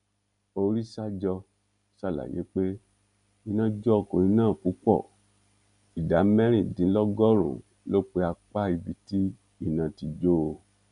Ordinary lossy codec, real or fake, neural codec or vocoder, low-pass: none; real; none; 10.8 kHz